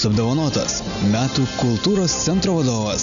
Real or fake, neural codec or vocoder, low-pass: real; none; 7.2 kHz